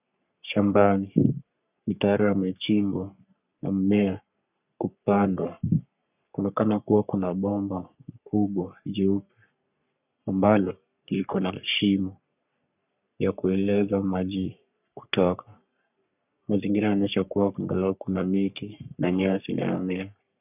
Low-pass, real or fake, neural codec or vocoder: 3.6 kHz; fake; codec, 44.1 kHz, 3.4 kbps, Pupu-Codec